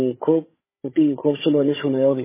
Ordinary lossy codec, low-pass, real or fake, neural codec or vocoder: MP3, 16 kbps; 3.6 kHz; fake; codec, 16 kHz, 16 kbps, FunCodec, trained on Chinese and English, 50 frames a second